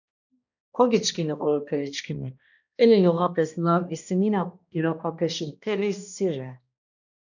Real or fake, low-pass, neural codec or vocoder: fake; 7.2 kHz; codec, 16 kHz, 1 kbps, X-Codec, HuBERT features, trained on balanced general audio